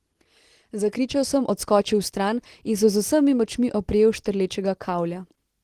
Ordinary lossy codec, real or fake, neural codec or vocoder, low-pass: Opus, 16 kbps; real; none; 14.4 kHz